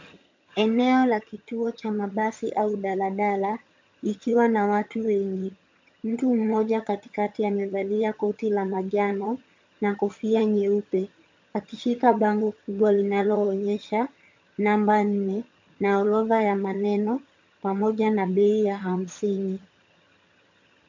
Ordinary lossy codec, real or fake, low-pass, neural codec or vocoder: MP3, 48 kbps; fake; 7.2 kHz; vocoder, 22.05 kHz, 80 mel bands, HiFi-GAN